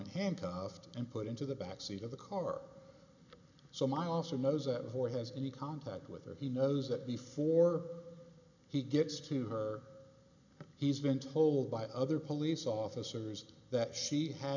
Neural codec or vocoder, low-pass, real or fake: none; 7.2 kHz; real